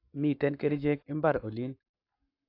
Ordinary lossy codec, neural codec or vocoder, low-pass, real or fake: AAC, 32 kbps; codec, 44.1 kHz, 7.8 kbps, Pupu-Codec; 5.4 kHz; fake